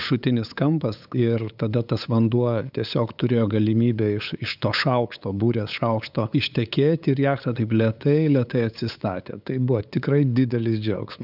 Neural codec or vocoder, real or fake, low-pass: codec, 16 kHz, 8 kbps, FunCodec, trained on LibriTTS, 25 frames a second; fake; 5.4 kHz